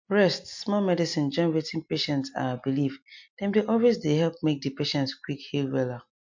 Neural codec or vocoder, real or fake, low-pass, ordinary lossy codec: none; real; 7.2 kHz; MP3, 48 kbps